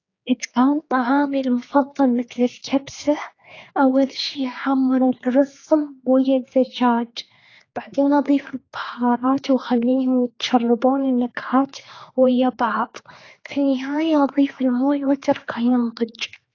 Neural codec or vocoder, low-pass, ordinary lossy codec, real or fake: codec, 16 kHz, 4 kbps, X-Codec, HuBERT features, trained on general audio; 7.2 kHz; AAC, 32 kbps; fake